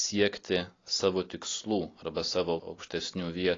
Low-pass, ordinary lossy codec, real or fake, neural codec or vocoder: 7.2 kHz; AAC, 32 kbps; real; none